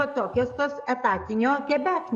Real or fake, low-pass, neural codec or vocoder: fake; 10.8 kHz; codec, 44.1 kHz, 7.8 kbps, DAC